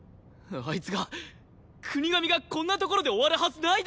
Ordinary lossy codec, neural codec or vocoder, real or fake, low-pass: none; none; real; none